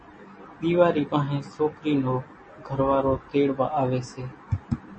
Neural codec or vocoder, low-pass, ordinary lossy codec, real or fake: none; 10.8 kHz; MP3, 32 kbps; real